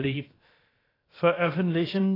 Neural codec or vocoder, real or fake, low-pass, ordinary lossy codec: codec, 16 kHz, about 1 kbps, DyCAST, with the encoder's durations; fake; 5.4 kHz; AAC, 24 kbps